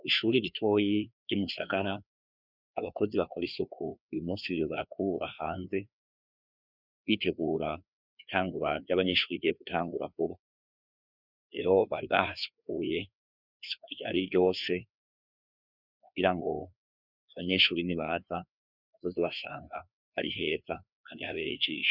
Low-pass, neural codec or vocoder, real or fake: 5.4 kHz; codec, 16 kHz, 2 kbps, FreqCodec, larger model; fake